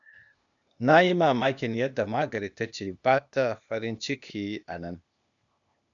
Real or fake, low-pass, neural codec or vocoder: fake; 7.2 kHz; codec, 16 kHz, 0.8 kbps, ZipCodec